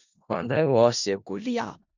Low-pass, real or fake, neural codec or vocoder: 7.2 kHz; fake; codec, 16 kHz in and 24 kHz out, 0.4 kbps, LongCat-Audio-Codec, four codebook decoder